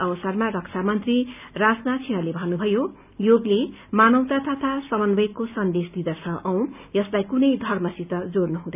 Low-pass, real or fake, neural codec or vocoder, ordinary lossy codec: 3.6 kHz; real; none; none